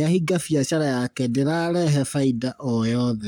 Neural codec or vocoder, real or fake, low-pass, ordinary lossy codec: codec, 44.1 kHz, 7.8 kbps, Pupu-Codec; fake; none; none